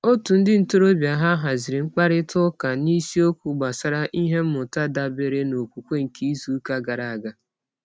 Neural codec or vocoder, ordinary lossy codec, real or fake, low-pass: none; none; real; none